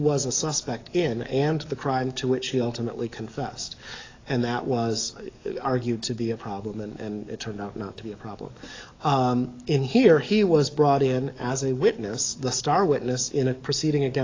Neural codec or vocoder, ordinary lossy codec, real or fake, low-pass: codec, 44.1 kHz, 7.8 kbps, DAC; AAC, 32 kbps; fake; 7.2 kHz